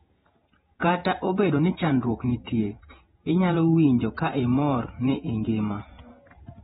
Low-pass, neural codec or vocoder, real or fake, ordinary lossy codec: 19.8 kHz; vocoder, 48 kHz, 128 mel bands, Vocos; fake; AAC, 16 kbps